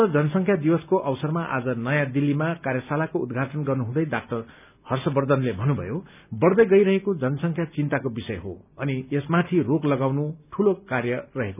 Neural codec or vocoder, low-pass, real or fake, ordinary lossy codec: none; 3.6 kHz; real; none